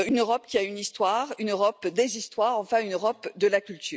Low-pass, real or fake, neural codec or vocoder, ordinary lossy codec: none; real; none; none